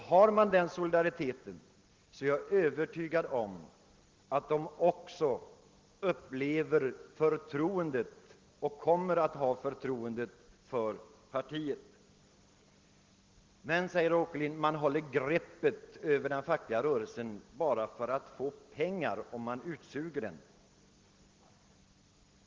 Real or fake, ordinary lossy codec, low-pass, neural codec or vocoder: real; Opus, 16 kbps; 7.2 kHz; none